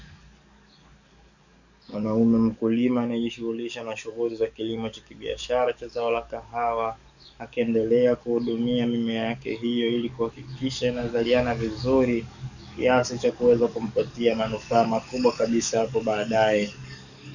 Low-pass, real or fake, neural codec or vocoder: 7.2 kHz; fake; codec, 44.1 kHz, 7.8 kbps, DAC